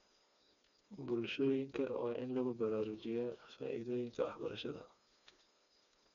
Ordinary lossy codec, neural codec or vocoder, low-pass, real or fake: none; codec, 16 kHz, 2 kbps, FreqCodec, smaller model; 7.2 kHz; fake